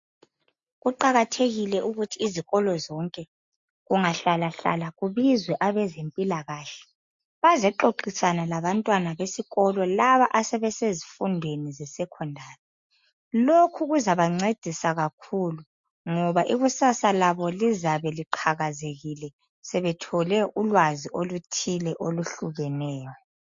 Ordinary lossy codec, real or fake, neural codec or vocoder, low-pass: MP3, 48 kbps; real; none; 7.2 kHz